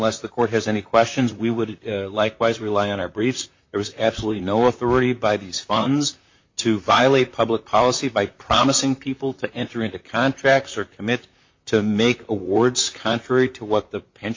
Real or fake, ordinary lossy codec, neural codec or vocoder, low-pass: fake; MP3, 48 kbps; vocoder, 44.1 kHz, 128 mel bands, Pupu-Vocoder; 7.2 kHz